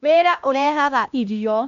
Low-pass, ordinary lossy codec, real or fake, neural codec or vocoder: 7.2 kHz; none; fake; codec, 16 kHz, 1 kbps, X-Codec, HuBERT features, trained on LibriSpeech